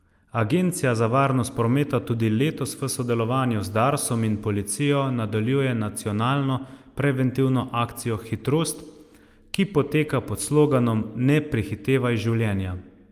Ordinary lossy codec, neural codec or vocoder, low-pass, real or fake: Opus, 32 kbps; none; 14.4 kHz; real